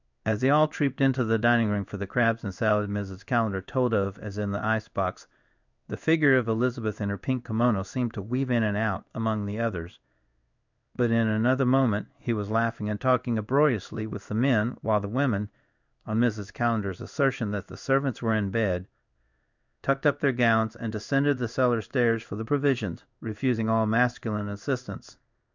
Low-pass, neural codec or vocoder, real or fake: 7.2 kHz; codec, 16 kHz in and 24 kHz out, 1 kbps, XY-Tokenizer; fake